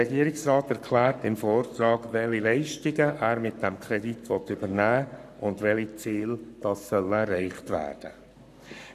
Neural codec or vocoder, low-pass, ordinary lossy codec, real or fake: codec, 44.1 kHz, 7.8 kbps, Pupu-Codec; 14.4 kHz; none; fake